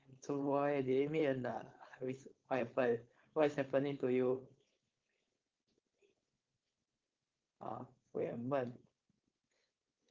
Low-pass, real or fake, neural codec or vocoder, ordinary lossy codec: 7.2 kHz; fake; codec, 16 kHz, 4.8 kbps, FACodec; Opus, 24 kbps